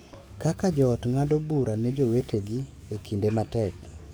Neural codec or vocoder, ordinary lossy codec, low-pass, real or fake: codec, 44.1 kHz, 7.8 kbps, DAC; none; none; fake